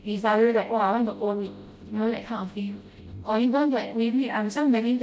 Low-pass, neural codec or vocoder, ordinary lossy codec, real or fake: none; codec, 16 kHz, 0.5 kbps, FreqCodec, smaller model; none; fake